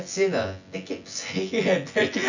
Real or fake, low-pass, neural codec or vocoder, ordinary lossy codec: fake; 7.2 kHz; vocoder, 24 kHz, 100 mel bands, Vocos; none